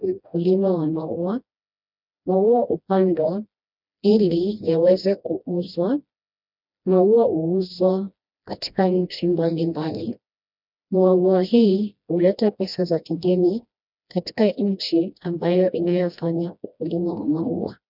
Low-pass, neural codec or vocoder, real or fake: 5.4 kHz; codec, 16 kHz, 1 kbps, FreqCodec, smaller model; fake